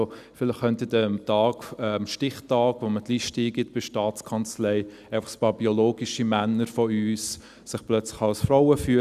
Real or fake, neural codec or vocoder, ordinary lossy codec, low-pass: real; none; none; 14.4 kHz